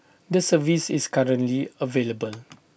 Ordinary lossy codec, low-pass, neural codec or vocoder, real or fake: none; none; none; real